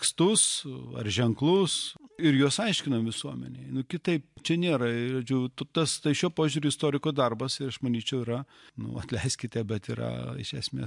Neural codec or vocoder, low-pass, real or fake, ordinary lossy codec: none; 10.8 kHz; real; MP3, 64 kbps